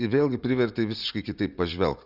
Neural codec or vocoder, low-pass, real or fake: vocoder, 44.1 kHz, 80 mel bands, Vocos; 5.4 kHz; fake